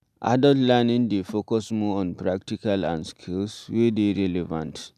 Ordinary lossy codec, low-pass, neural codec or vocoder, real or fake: none; 14.4 kHz; none; real